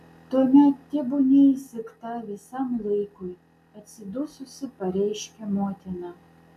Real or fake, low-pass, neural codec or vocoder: real; 14.4 kHz; none